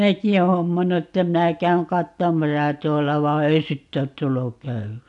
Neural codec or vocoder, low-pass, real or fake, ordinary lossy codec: none; 10.8 kHz; real; AAC, 64 kbps